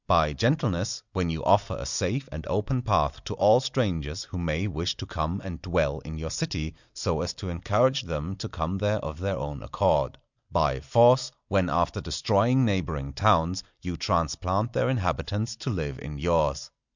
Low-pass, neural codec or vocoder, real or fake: 7.2 kHz; none; real